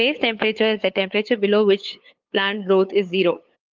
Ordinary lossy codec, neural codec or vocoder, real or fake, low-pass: Opus, 32 kbps; codec, 16 kHz, 8 kbps, FunCodec, trained on LibriTTS, 25 frames a second; fake; 7.2 kHz